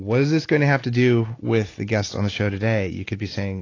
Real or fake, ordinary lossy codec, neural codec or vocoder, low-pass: real; AAC, 32 kbps; none; 7.2 kHz